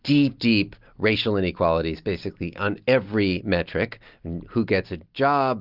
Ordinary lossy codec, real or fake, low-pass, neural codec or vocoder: Opus, 32 kbps; real; 5.4 kHz; none